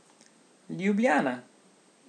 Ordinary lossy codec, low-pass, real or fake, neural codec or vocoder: none; 9.9 kHz; real; none